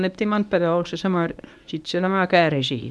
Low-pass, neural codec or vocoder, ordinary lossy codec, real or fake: none; codec, 24 kHz, 0.9 kbps, WavTokenizer, medium speech release version 1; none; fake